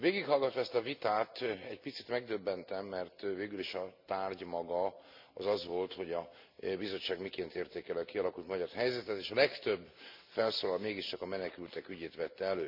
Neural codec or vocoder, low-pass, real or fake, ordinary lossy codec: none; 5.4 kHz; real; MP3, 48 kbps